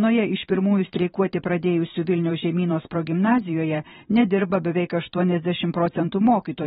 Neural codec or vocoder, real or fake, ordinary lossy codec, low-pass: vocoder, 44.1 kHz, 128 mel bands every 512 samples, BigVGAN v2; fake; AAC, 16 kbps; 19.8 kHz